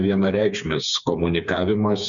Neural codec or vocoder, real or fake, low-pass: codec, 16 kHz, 4 kbps, FreqCodec, smaller model; fake; 7.2 kHz